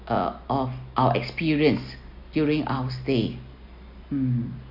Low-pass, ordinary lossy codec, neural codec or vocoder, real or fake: 5.4 kHz; none; none; real